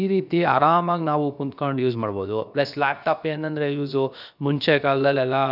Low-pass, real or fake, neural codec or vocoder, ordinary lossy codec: 5.4 kHz; fake; codec, 16 kHz, about 1 kbps, DyCAST, with the encoder's durations; none